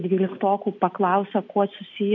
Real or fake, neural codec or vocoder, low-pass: real; none; 7.2 kHz